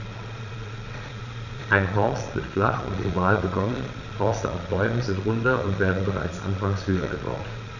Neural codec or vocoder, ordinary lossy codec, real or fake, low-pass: vocoder, 22.05 kHz, 80 mel bands, Vocos; none; fake; 7.2 kHz